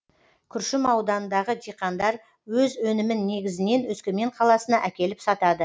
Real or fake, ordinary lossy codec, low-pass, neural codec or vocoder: real; none; none; none